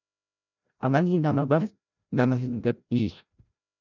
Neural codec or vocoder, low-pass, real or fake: codec, 16 kHz, 0.5 kbps, FreqCodec, larger model; 7.2 kHz; fake